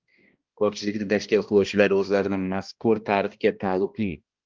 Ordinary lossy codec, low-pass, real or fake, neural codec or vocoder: Opus, 32 kbps; 7.2 kHz; fake; codec, 16 kHz, 1 kbps, X-Codec, HuBERT features, trained on balanced general audio